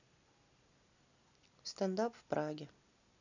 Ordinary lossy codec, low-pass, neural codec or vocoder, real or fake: none; 7.2 kHz; none; real